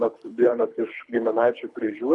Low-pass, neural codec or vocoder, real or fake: 10.8 kHz; codec, 24 kHz, 3 kbps, HILCodec; fake